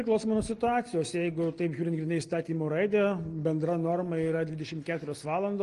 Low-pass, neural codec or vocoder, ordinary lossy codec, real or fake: 10.8 kHz; none; Opus, 24 kbps; real